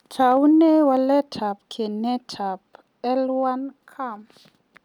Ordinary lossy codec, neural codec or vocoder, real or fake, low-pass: none; none; real; 19.8 kHz